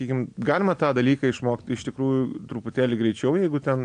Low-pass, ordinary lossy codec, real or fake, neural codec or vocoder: 9.9 kHz; MP3, 96 kbps; real; none